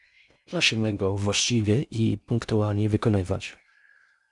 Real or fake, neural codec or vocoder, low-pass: fake; codec, 16 kHz in and 24 kHz out, 0.6 kbps, FocalCodec, streaming, 4096 codes; 10.8 kHz